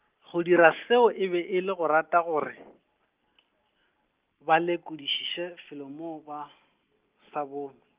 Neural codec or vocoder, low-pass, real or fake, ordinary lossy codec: none; 3.6 kHz; real; Opus, 32 kbps